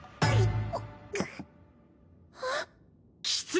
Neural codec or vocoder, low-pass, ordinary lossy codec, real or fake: none; none; none; real